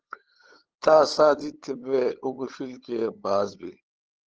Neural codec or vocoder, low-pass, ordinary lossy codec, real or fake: codec, 16 kHz, 8 kbps, FunCodec, trained on LibriTTS, 25 frames a second; 7.2 kHz; Opus, 16 kbps; fake